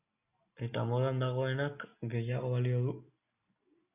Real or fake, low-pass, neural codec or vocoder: real; 3.6 kHz; none